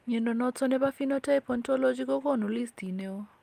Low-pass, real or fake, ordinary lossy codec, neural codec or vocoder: 14.4 kHz; real; Opus, 32 kbps; none